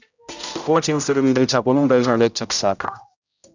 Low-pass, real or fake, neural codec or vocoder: 7.2 kHz; fake; codec, 16 kHz, 0.5 kbps, X-Codec, HuBERT features, trained on general audio